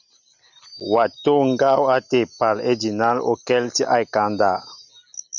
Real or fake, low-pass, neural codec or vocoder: real; 7.2 kHz; none